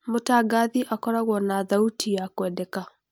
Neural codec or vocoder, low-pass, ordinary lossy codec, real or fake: none; none; none; real